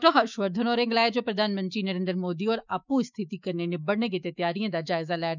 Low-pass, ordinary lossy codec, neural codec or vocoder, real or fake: 7.2 kHz; none; autoencoder, 48 kHz, 128 numbers a frame, DAC-VAE, trained on Japanese speech; fake